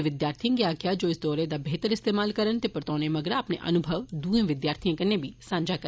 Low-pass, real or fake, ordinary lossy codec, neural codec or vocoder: none; real; none; none